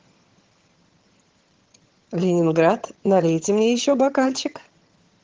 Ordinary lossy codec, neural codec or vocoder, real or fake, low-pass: Opus, 16 kbps; vocoder, 22.05 kHz, 80 mel bands, HiFi-GAN; fake; 7.2 kHz